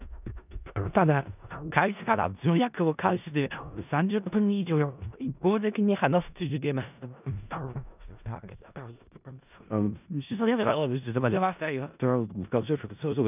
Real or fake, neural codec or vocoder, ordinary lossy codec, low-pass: fake; codec, 16 kHz in and 24 kHz out, 0.4 kbps, LongCat-Audio-Codec, four codebook decoder; none; 3.6 kHz